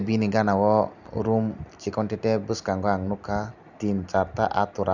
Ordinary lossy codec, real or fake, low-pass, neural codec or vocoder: none; real; 7.2 kHz; none